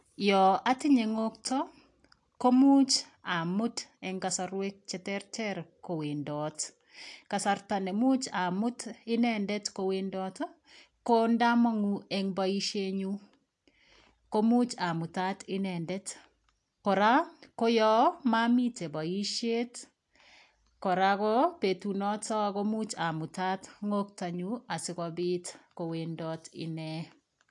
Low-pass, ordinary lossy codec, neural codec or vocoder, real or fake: 10.8 kHz; AAC, 64 kbps; none; real